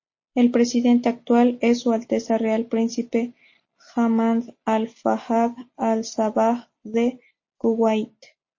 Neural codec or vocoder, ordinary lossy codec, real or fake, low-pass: none; MP3, 32 kbps; real; 7.2 kHz